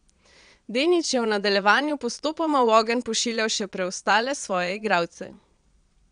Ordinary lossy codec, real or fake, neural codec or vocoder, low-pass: none; fake; vocoder, 22.05 kHz, 80 mel bands, Vocos; 9.9 kHz